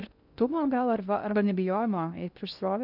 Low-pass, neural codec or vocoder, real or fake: 5.4 kHz; codec, 16 kHz in and 24 kHz out, 0.6 kbps, FocalCodec, streaming, 2048 codes; fake